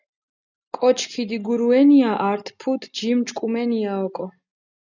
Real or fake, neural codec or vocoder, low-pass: real; none; 7.2 kHz